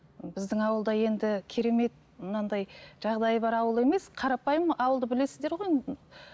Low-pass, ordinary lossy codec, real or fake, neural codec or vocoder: none; none; real; none